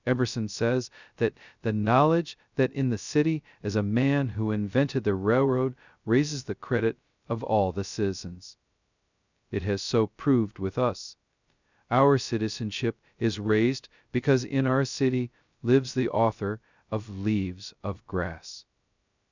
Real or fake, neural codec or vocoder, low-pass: fake; codec, 16 kHz, 0.2 kbps, FocalCodec; 7.2 kHz